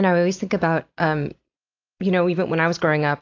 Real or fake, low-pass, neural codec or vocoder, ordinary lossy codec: real; 7.2 kHz; none; AAC, 48 kbps